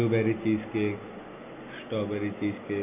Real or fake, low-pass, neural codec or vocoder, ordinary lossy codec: real; 3.6 kHz; none; none